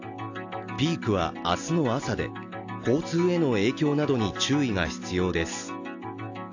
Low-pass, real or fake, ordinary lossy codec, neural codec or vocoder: 7.2 kHz; real; AAC, 48 kbps; none